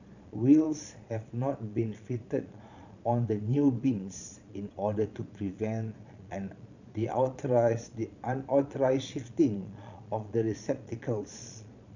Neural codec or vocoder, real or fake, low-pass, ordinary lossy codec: vocoder, 22.05 kHz, 80 mel bands, Vocos; fake; 7.2 kHz; none